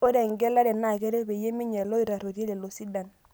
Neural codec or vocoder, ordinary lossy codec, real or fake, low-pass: none; none; real; none